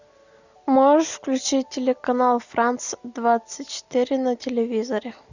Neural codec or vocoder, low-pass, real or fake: none; 7.2 kHz; real